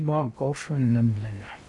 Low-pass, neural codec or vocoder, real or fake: 10.8 kHz; codec, 16 kHz in and 24 kHz out, 0.6 kbps, FocalCodec, streaming, 2048 codes; fake